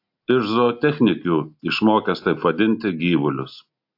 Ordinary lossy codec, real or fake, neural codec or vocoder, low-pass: AAC, 48 kbps; real; none; 5.4 kHz